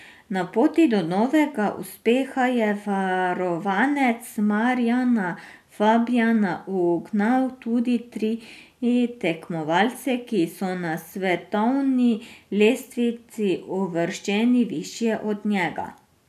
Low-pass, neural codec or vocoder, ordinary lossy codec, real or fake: 14.4 kHz; none; none; real